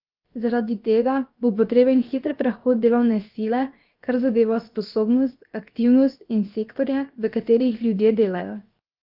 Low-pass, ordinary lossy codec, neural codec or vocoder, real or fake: 5.4 kHz; Opus, 32 kbps; codec, 16 kHz, 0.7 kbps, FocalCodec; fake